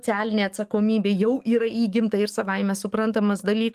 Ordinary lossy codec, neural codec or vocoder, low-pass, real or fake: Opus, 32 kbps; codec, 44.1 kHz, 7.8 kbps, DAC; 14.4 kHz; fake